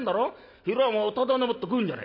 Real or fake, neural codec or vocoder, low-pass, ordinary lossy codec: real; none; 5.4 kHz; none